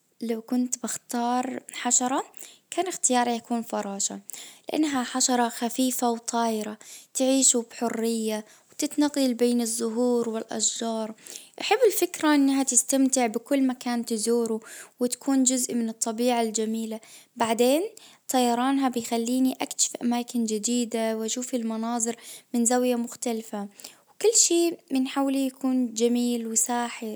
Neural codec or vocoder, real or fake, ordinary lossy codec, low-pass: none; real; none; none